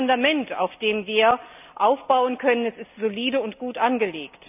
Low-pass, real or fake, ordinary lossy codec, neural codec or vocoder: 3.6 kHz; real; none; none